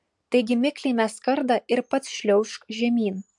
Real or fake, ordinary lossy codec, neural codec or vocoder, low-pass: real; MP3, 64 kbps; none; 10.8 kHz